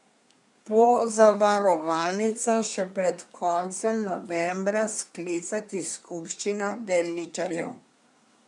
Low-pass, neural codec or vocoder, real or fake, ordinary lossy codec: 10.8 kHz; codec, 24 kHz, 1 kbps, SNAC; fake; none